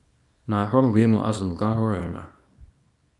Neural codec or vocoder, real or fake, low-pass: codec, 24 kHz, 0.9 kbps, WavTokenizer, small release; fake; 10.8 kHz